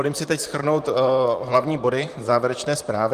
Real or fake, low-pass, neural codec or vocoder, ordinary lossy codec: fake; 14.4 kHz; vocoder, 44.1 kHz, 128 mel bands, Pupu-Vocoder; Opus, 32 kbps